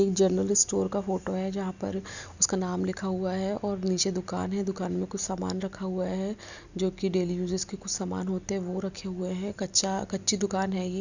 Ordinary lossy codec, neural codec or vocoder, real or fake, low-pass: none; none; real; 7.2 kHz